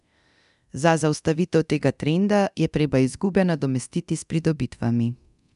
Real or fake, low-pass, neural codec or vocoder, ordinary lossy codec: fake; 10.8 kHz; codec, 24 kHz, 0.9 kbps, DualCodec; none